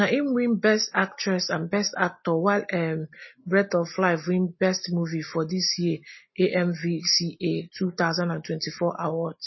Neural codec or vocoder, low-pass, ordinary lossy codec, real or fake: none; 7.2 kHz; MP3, 24 kbps; real